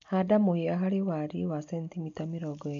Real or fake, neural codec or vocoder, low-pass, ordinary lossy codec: real; none; 7.2 kHz; MP3, 48 kbps